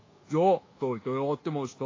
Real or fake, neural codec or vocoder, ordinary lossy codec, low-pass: fake; codec, 24 kHz, 1.2 kbps, DualCodec; AAC, 32 kbps; 7.2 kHz